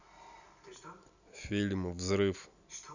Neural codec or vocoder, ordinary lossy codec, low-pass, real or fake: none; none; 7.2 kHz; real